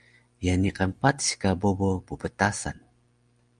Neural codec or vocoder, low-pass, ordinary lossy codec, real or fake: none; 9.9 kHz; Opus, 32 kbps; real